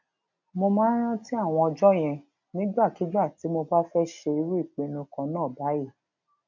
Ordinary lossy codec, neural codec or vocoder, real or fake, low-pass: none; none; real; 7.2 kHz